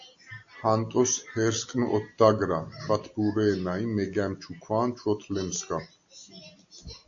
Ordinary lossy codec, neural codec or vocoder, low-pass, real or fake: AAC, 48 kbps; none; 7.2 kHz; real